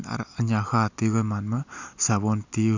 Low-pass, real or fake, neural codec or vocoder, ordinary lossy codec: 7.2 kHz; real; none; none